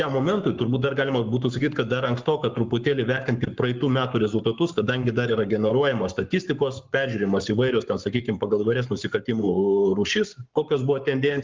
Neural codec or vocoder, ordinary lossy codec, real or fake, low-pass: codec, 44.1 kHz, 7.8 kbps, Pupu-Codec; Opus, 24 kbps; fake; 7.2 kHz